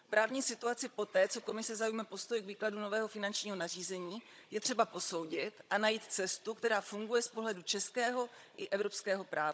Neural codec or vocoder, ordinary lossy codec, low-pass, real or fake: codec, 16 kHz, 16 kbps, FunCodec, trained on Chinese and English, 50 frames a second; none; none; fake